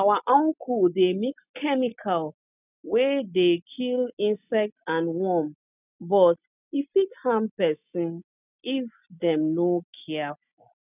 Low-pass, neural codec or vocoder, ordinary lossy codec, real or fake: 3.6 kHz; none; none; real